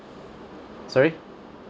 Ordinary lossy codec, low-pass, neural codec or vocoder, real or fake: none; none; none; real